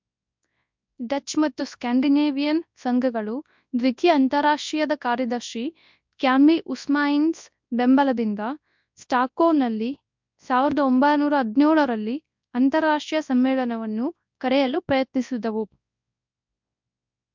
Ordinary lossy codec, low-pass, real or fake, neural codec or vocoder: MP3, 64 kbps; 7.2 kHz; fake; codec, 24 kHz, 0.9 kbps, WavTokenizer, large speech release